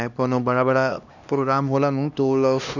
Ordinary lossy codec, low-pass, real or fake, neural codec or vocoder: none; 7.2 kHz; fake; codec, 16 kHz, 1 kbps, X-Codec, HuBERT features, trained on LibriSpeech